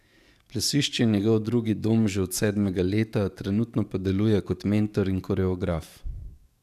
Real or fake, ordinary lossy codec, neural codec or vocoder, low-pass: fake; none; codec, 44.1 kHz, 7.8 kbps, DAC; 14.4 kHz